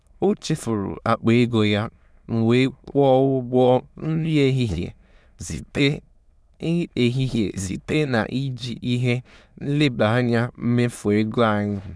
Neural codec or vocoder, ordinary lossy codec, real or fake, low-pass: autoencoder, 22.05 kHz, a latent of 192 numbers a frame, VITS, trained on many speakers; none; fake; none